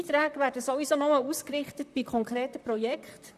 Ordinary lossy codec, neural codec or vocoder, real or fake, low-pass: none; vocoder, 44.1 kHz, 128 mel bands, Pupu-Vocoder; fake; 14.4 kHz